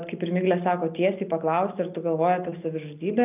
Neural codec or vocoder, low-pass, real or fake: none; 3.6 kHz; real